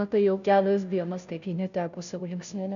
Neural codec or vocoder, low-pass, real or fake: codec, 16 kHz, 0.5 kbps, FunCodec, trained on Chinese and English, 25 frames a second; 7.2 kHz; fake